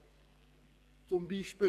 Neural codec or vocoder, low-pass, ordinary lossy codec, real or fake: codec, 44.1 kHz, 2.6 kbps, SNAC; 14.4 kHz; none; fake